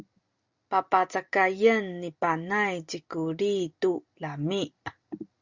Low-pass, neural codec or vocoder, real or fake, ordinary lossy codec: 7.2 kHz; none; real; Opus, 64 kbps